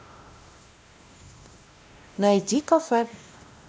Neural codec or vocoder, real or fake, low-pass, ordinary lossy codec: codec, 16 kHz, 1 kbps, X-Codec, WavLM features, trained on Multilingual LibriSpeech; fake; none; none